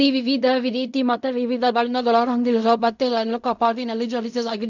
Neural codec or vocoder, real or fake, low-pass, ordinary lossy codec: codec, 16 kHz in and 24 kHz out, 0.4 kbps, LongCat-Audio-Codec, fine tuned four codebook decoder; fake; 7.2 kHz; none